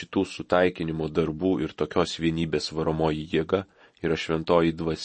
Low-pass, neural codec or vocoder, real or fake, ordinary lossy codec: 10.8 kHz; none; real; MP3, 32 kbps